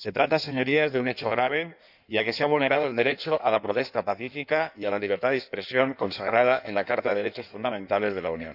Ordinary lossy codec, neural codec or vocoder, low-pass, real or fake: none; codec, 16 kHz in and 24 kHz out, 1.1 kbps, FireRedTTS-2 codec; 5.4 kHz; fake